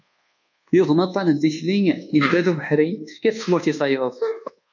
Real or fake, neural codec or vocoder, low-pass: fake; codec, 24 kHz, 1.2 kbps, DualCodec; 7.2 kHz